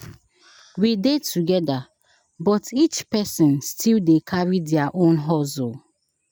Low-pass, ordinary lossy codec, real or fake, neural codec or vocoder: none; none; real; none